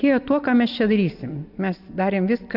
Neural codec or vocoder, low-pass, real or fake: none; 5.4 kHz; real